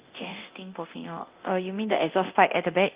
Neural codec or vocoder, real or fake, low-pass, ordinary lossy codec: codec, 24 kHz, 0.9 kbps, DualCodec; fake; 3.6 kHz; Opus, 32 kbps